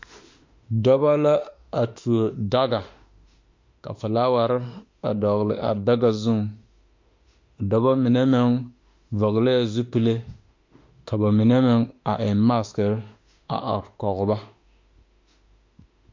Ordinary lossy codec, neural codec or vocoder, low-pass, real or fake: MP3, 48 kbps; autoencoder, 48 kHz, 32 numbers a frame, DAC-VAE, trained on Japanese speech; 7.2 kHz; fake